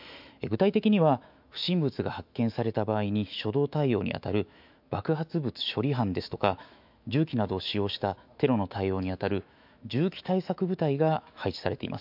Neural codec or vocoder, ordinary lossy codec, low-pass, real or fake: autoencoder, 48 kHz, 128 numbers a frame, DAC-VAE, trained on Japanese speech; MP3, 48 kbps; 5.4 kHz; fake